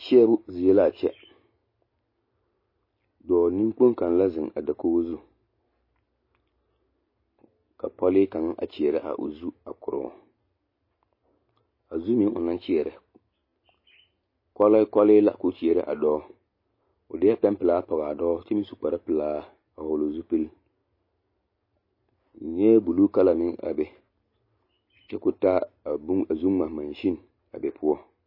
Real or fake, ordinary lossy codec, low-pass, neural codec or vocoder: real; MP3, 24 kbps; 5.4 kHz; none